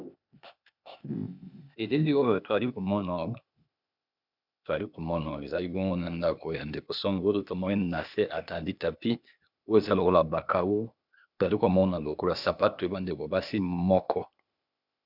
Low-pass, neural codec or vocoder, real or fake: 5.4 kHz; codec, 16 kHz, 0.8 kbps, ZipCodec; fake